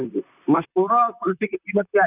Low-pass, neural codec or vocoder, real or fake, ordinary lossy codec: 3.6 kHz; vocoder, 44.1 kHz, 128 mel bands, Pupu-Vocoder; fake; none